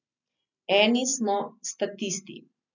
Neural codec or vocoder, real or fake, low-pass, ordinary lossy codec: none; real; 7.2 kHz; none